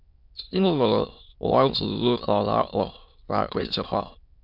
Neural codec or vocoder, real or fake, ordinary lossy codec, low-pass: autoencoder, 22.05 kHz, a latent of 192 numbers a frame, VITS, trained on many speakers; fake; MP3, 48 kbps; 5.4 kHz